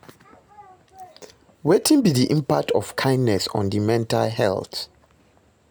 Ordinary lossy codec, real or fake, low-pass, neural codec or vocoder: none; real; none; none